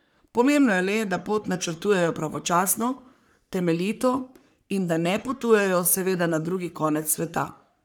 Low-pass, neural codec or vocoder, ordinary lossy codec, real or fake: none; codec, 44.1 kHz, 3.4 kbps, Pupu-Codec; none; fake